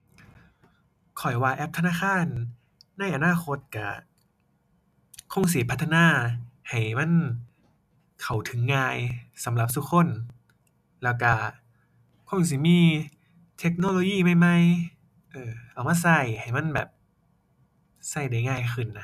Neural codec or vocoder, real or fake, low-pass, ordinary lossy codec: none; real; 14.4 kHz; none